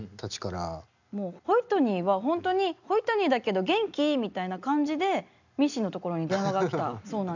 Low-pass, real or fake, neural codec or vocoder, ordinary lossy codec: 7.2 kHz; real; none; none